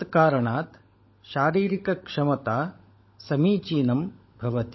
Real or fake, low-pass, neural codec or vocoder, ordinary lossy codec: fake; 7.2 kHz; codec, 16 kHz, 16 kbps, FunCodec, trained on LibriTTS, 50 frames a second; MP3, 24 kbps